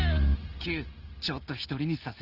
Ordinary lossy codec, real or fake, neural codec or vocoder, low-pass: Opus, 16 kbps; real; none; 5.4 kHz